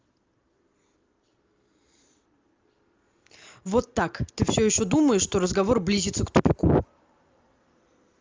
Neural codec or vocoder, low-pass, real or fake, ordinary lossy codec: none; 7.2 kHz; real; Opus, 32 kbps